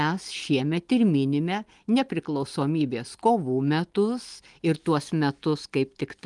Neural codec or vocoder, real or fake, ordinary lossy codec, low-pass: none; real; Opus, 24 kbps; 10.8 kHz